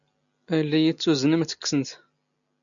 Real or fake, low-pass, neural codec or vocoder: real; 7.2 kHz; none